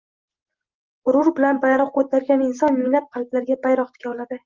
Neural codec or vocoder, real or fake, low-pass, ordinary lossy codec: none; real; 7.2 kHz; Opus, 32 kbps